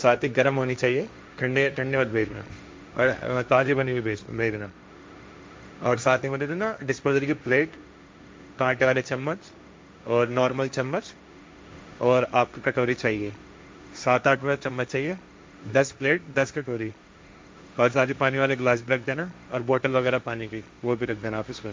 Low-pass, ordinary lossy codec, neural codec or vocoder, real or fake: none; none; codec, 16 kHz, 1.1 kbps, Voila-Tokenizer; fake